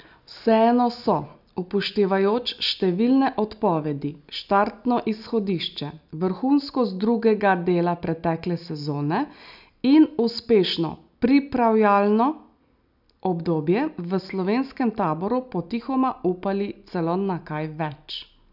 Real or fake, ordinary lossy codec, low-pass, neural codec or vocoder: real; none; 5.4 kHz; none